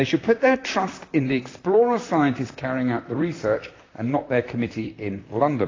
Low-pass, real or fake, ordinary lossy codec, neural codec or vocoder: 7.2 kHz; fake; AAC, 32 kbps; vocoder, 44.1 kHz, 128 mel bands, Pupu-Vocoder